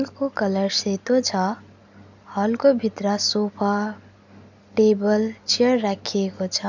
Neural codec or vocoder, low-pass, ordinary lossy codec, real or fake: none; 7.2 kHz; none; real